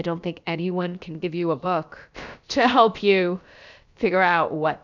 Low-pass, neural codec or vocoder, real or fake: 7.2 kHz; codec, 16 kHz, about 1 kbps, DyCAST, with the encoder's durations; fake